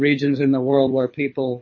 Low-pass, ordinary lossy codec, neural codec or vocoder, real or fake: 7.2 kHz; MP3, 32 kbps; codec, 24 kHz, 6 kbps, HILCodec; fake